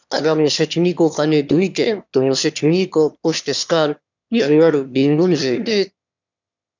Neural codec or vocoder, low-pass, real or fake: autoencoder, 22.05 kHz, a latent of 192 numbers a frame, VITS, trained on one speaker; 7.2 kHz; fake